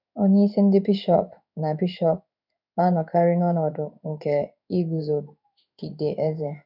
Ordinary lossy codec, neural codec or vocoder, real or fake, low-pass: none; codec, 16 kHz in and 24 kHz out, 1 kbps, XY-Tokenizer; fake; 5.4 kHz